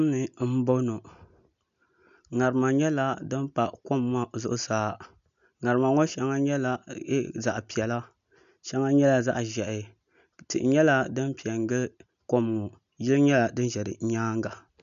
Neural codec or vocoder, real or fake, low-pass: none; real; 7.2 kHz